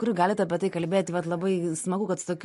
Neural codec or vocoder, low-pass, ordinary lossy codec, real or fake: none; 14.4 kHz; MP3, 48 kbps; real